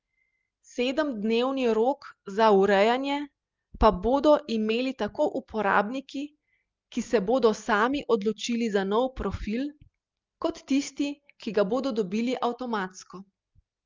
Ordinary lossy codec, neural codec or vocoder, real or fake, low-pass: Opus, 24 kbps; none; real; 7.2 kHz